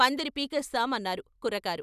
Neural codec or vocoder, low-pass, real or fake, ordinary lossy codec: none; 14.4 kHz; real; none